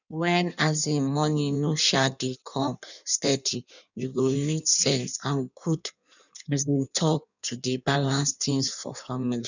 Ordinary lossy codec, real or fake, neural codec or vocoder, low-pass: none; fake; codec, 16 kHz in and 24 kHz out, 1.1 kbps, FireRedTTS-2 codec; 7.2 kHz